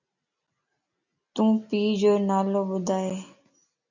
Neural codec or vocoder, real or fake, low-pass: none; real; 7.2 kHz